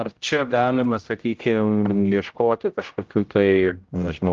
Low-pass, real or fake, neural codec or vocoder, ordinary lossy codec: 7.2 kHz; fake; codec, 16 kHz, 0.5 kbps, X-Codec, HuBERT features, trained on general audio; Opus, 32 kbps